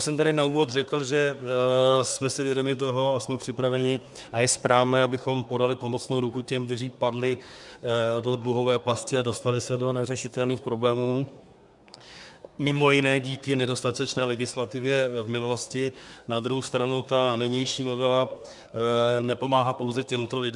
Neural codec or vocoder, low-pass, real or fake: codec, 24 kHz, 1 kbps, SNAC; 10.8 kHz; fake